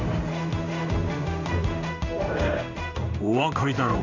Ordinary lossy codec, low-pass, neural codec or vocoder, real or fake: none; 7.2 kHz; codec, 16 kHz in and 24 kHz out, 1 kbps, XY-Tokenizer; fake